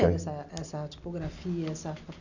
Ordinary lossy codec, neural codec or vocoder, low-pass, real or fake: none; none; 7.2 kHz; real